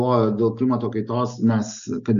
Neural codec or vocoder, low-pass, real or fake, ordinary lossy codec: none; 7.2 kHz; real; AAC, 96 kbps